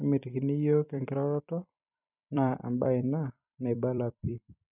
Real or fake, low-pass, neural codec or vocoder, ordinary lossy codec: fake; 3.6 kHz; vocoder, 44.1 kHz, 128 mel bands every 256 samples, BigVGAN v2; none